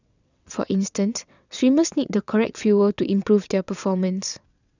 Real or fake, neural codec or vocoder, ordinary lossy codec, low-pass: fake; vocoder, 22.05 kHz, 80 mel bands, WaveNeXt; none; 7.2 kHz